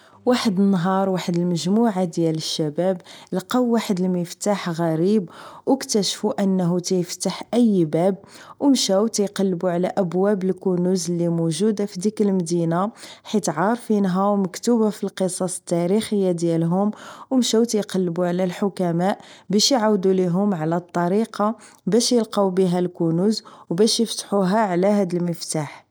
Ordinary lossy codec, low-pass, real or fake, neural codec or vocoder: none; none; real; none